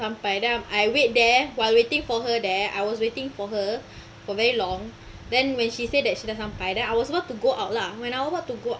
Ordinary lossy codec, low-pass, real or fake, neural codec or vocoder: none; none; real; none